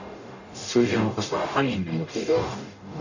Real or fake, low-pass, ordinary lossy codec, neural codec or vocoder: fake; 7.2 kHz; none; codec, 44.1 kHz, 0.9 kbps, DAC